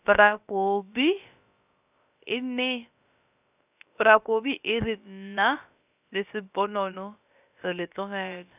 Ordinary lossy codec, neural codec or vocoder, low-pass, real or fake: none; codec, 16 kHz, about 1 kbps, DyCAST, with the encoder's durations; 3.6 kHz; fake